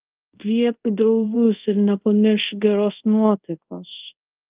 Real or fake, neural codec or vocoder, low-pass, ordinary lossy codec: fake; codec, 24 kHz, 0.5 kbps, DualCodec; 3.6 kHz; Opus, 32 kbps